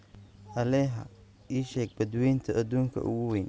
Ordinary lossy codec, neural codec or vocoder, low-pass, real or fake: none; none; none; real